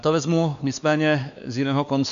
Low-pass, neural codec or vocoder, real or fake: 7.2 kHz; codec, 16 kHz, 2 kbps, X-Codec, WavLM features, trained on Multilingual LibriSpeech; fake